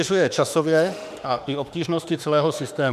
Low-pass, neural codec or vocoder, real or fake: 14.4 kHz; autoencoder, 48 kHz, 32 numbers a frame, DAC-VAE, trained on Japanese speech; fake